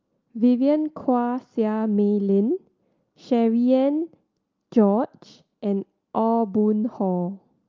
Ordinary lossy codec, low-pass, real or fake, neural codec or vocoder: Opus, 24 kbps; 7.2 kHz; real; none